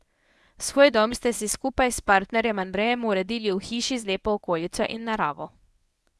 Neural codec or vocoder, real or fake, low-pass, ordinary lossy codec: codec, 24 kHz, 0.9 kbps, WavTokenizer, medium speech release version 1; fake; none; none